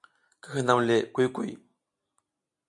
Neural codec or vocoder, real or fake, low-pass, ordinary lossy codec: none; real; 10.8 kHz; MP3, 96 kbps